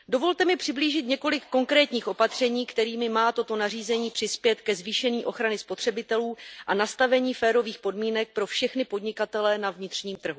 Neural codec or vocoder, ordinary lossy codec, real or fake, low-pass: none; none; real; none